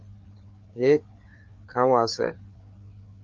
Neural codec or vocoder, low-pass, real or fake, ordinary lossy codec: codec, 16 kHz, 4 kbps, FreqCodec, larger model; 7.2 kHz; fake; Opus, 32 kbps